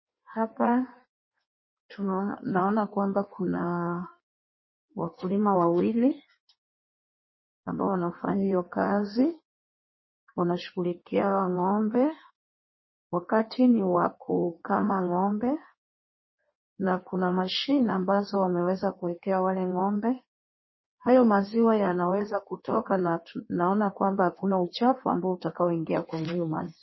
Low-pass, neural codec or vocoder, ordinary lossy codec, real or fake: 7.2 kHz; codec, 16 kHz in and 24 kHz out, 1.1 kbps, FireRedTTS-2 codec; MP3, 24 kbps; fake